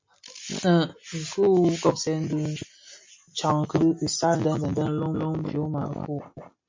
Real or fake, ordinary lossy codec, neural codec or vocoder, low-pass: real; MP3, 48 kbps; none; 7.2 kHz